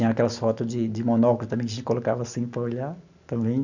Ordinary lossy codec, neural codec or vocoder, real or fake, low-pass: none; none; real; 7.2 kHz